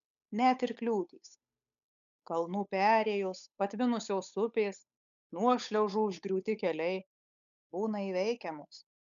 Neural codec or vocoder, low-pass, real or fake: codec, 16 kHz, 8 kbps, FunCodec, trained on Chinese and English, 25 frames a second; 7.2 kHz; fake